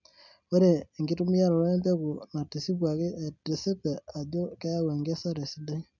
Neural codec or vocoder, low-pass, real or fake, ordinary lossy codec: none; 7.2 kHz; real; none